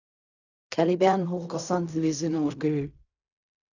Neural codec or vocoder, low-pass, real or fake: codec, 16 kHz in and 24 kHz out, 0.4 kbps, LongCat-Audio-Codec, fine tuned four codebook decoder; 7.2 kHz; fake